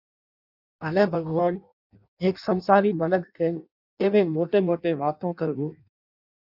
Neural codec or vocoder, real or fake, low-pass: codec, 16 kHz in and 24 kHz out, 0.6 kbps, FireRedTTS-2 codec; fake; 5.4 kHz